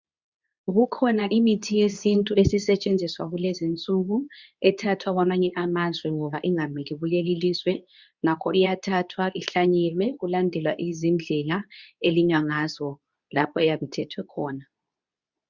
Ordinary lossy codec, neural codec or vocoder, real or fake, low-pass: Opus, 64 kbps; codec, 24 kHz, 0.9 kbps, WavTokenizer, medium speech release version 2; fake; 7.2 kHz